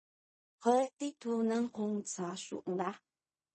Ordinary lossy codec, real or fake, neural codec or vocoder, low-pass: MP3, 32 kbps; fake; codec, 16 kHz in and 24 kHz out, 0.4 kbps, LongCat-Audio-Codec, fine tuned four codebook decoder; 10.8 kHz